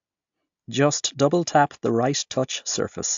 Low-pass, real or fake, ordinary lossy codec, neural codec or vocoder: 7.2 kHz; real; AAC, 64 kbps; none